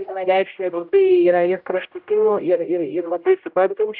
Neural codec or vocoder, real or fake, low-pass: codec, 16 kHz, 0.5 kbps, X-Codec, HuBERT features, trained on general audio; fake; 5.4 kHz